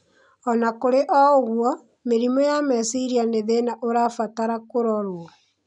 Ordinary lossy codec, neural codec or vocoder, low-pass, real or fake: none; none; 10.8 kHz; real